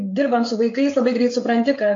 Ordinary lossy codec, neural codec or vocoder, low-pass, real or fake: AAC, 48 kbps; codec, 16 kHz, 16 kbps, FreqCodec, smaller model; 7.2 kHz; fake